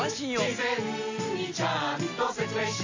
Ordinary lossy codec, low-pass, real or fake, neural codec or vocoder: AAC, 48 kbps; 7.2 kHz; real; none